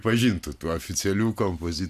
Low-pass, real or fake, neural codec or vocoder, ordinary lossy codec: 14.4 kHz; real; none; MP3, 96 kbps